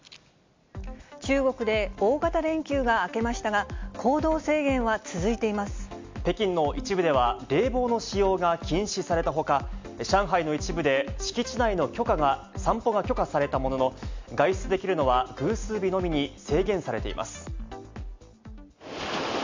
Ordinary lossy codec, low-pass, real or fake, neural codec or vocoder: MP3, 64 kbps; 7.2 kHz; real; none